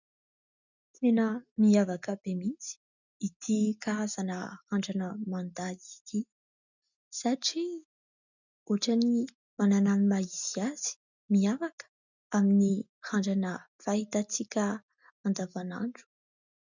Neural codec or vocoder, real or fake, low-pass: none; real; 7.2 kHz